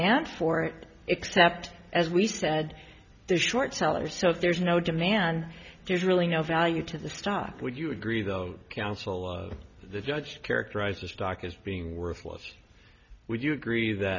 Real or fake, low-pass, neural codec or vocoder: real; 7.2 kHz; none